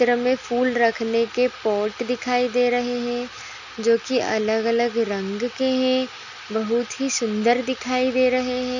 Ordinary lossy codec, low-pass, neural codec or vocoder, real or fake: none; 7.2 kHz; none; real